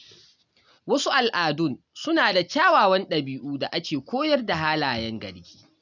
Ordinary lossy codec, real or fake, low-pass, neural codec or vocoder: none; real; 7.2 kHz; none